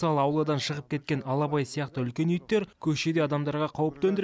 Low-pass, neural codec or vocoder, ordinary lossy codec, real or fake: none; none; none; real